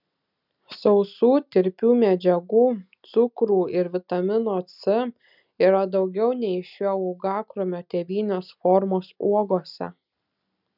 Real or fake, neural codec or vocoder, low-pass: real; none; 5.4 kHz